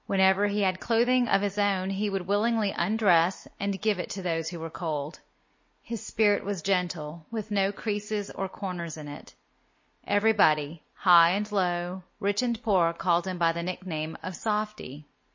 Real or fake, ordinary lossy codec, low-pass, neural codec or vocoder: real; MP3, 32 kbps; 7.2 kHz; none